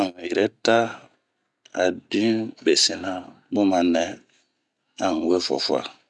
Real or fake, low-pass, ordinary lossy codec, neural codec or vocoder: real; 10.8 kHz; none; none